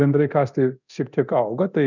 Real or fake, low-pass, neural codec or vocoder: fake; 7.2 kHz; codec, 24 kHz, 0.9 kbps, DualCodec